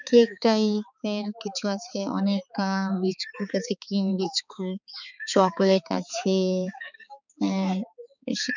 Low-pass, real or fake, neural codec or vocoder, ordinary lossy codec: 7.2 kHz; fake; codec, 16 kHz, 4 kbps, X-Codec, HuBERT features, trained on balanced general audio; none